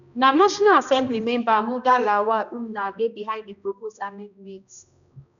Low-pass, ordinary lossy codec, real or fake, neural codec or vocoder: 7.2 kHz; none; fake; codec, 16 kHz, 1 kbps, X-Codec, HuBERT features, trained on balanced general audio